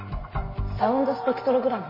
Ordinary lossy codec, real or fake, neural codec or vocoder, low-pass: MP3, 24 kbps; real; none; 5.4 kHz